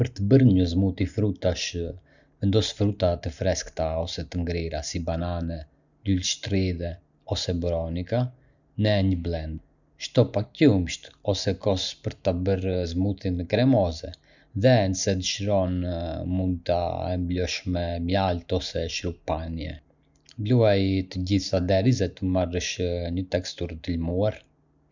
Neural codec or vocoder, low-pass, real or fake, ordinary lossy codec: none; 7.2 kHz; real; none